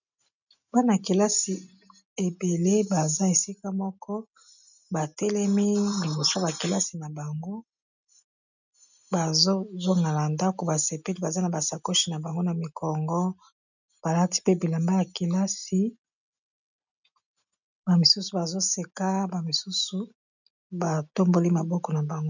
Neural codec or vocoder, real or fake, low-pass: none; real; 7.2 kHz